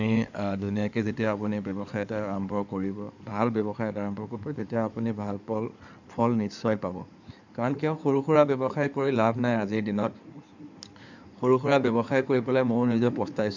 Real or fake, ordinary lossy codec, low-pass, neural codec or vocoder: fake; none; 7.2 kHz; codec, 16 kHz in and 24 kHz out, 2.2 kbps, FireRedTTS-2 codec